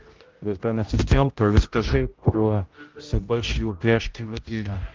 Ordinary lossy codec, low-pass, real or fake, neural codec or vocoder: Opus, 32 kbps; 7.2 kHz; fake; codec, 16 kHz, 0.5 kbps, X-Codec, HuBERT features, trained on general audio